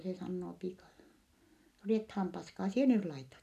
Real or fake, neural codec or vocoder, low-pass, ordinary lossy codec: real; none; 14.4 kHz; none